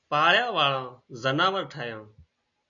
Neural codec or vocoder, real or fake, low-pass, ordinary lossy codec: none; real; 7.2 kHz; MP3, 96 kbps